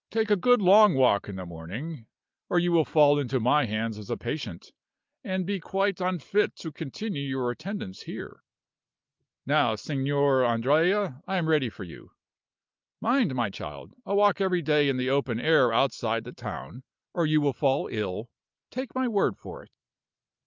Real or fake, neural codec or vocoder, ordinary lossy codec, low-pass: real; none; Opus, 24 kbps; 7.2 kHz